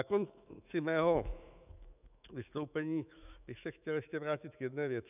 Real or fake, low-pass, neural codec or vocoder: fake; 3.6 kHz; autoencoder, 48 kHz, 128 numbers a frame, DAC-VAE, trained on Japanese speech